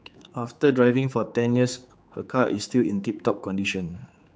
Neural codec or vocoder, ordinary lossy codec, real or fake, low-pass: codec, 16 kHz, 4 kbps, X-Codec, HuBERT features, trained on general audio; none; fake; none